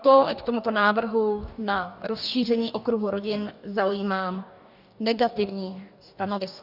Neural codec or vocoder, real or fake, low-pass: codec, 44.1 kHz, 2.6 kbps, DAC; fake; 5.4 kHz